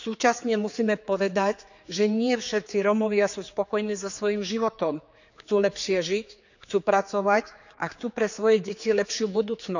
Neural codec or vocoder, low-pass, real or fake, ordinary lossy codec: codec, 16 kHz, 4 kbps, X-Codec, HuBERT features, trained on general audio; 7.2 kHz; fake; none